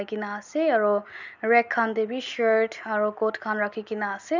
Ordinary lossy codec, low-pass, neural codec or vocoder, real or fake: none; 7.2 kHz; none; real